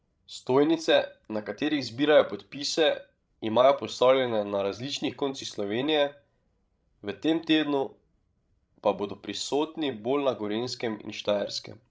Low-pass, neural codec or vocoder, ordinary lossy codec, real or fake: none; codec, 16 kHz, 16 kbps, FreqCodec, larger model; none; fake